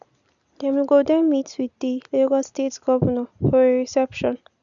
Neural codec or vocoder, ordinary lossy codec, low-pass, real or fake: none; none; 7.2 kHz; real